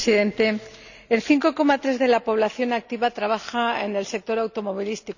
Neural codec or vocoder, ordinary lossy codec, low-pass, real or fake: none; none; 7.2 kHz; real